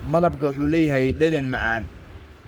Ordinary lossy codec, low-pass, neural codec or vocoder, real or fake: none; none; codec, 44.1 kHz, 3.4 kbps, Pupu-Codec; fake